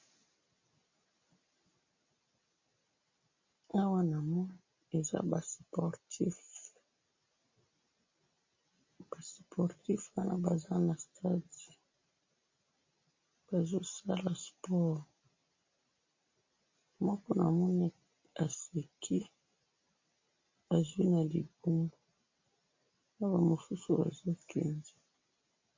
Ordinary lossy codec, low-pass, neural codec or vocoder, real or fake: MP3, 32 kbps; 7.2 kHz; none; real